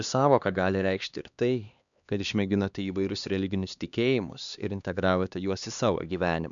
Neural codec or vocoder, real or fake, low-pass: codec, 16 kHz, 2 kbps, X-Codec, HuBERT features, trained on LibriSpeech; fake; 7.2 kHz